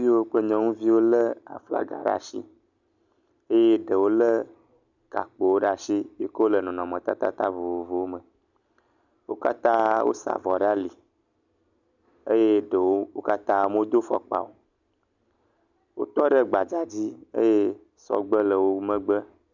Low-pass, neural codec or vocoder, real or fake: 7.2 kHz; none; real